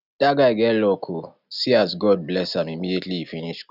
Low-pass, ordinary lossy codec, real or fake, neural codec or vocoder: 5.4 kHz; none; real; none